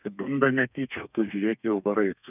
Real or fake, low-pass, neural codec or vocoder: fake; 3.6 kHz; codec, 32 kHz, 1.9 kbps, SNAC